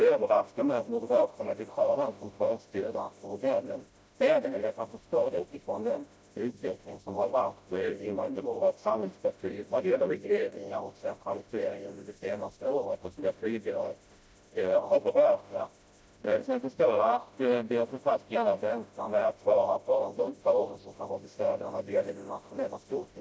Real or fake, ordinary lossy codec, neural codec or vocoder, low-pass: fake; none; codec, 16 kHz, 0.5 kbps, FreqCodec, smaller model; none